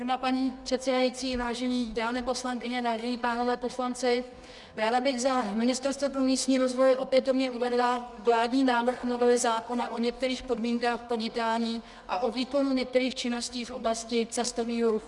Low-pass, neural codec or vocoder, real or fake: 10.8 kHz; codec, 24 kHz, 0.9 kbps, WavTokenizer, medium music audio release; fake